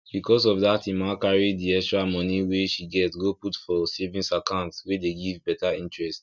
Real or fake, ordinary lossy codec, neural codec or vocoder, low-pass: real; none; none; 7.2 kHz